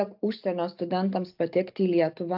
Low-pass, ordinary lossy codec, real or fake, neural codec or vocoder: 5.4 kHz; AAC, 48 kbps; real; none